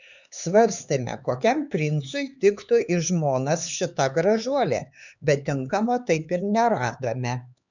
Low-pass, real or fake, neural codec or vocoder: 7.2 kHz; fake; codec, 16 kHz, 4 kbps, X-Codec, HuBERT features, trained on LibriSpeech